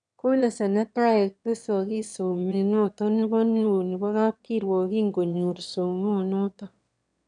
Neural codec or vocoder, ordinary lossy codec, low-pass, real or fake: autoencoder, 22.05 kHz, a latent of 192 numbers a frame, VITS, trained on one speaker; none; 9.9 kHz; fake